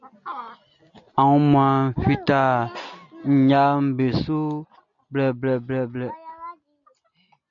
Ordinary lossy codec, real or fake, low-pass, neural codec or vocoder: MP3, 96 kbps; real; 7.2 kHz; none